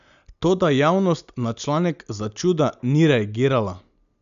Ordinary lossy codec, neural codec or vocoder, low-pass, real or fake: none; none; 7.2 kHz; real